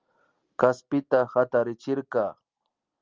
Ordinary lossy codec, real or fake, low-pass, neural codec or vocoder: Opus, 32 kbps; real; 7.2 kHz; none